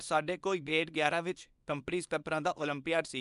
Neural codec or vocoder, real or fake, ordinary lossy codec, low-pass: codec, 24 kHz, 0.9 kbps, WavTokenizer, small release; fake; none; 10.8 kHz